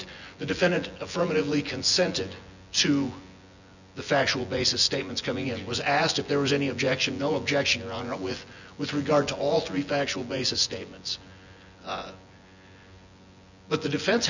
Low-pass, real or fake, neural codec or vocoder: 7.2 kHz; fake; vocoder, 24 kHz, 100 mel bands, Vocos